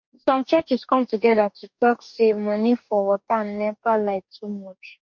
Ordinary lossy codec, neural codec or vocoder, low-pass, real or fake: MP3, 48 kbps; codec, 44.1 kHz, 2.6 kbps, DAC; 7.2 kHz; fake